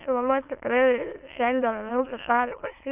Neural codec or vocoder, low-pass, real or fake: autoencoder, 22.05 kHz, a latent of 192 numbers a frame, VITS, trained on many speakers; 3.6 kHz; fake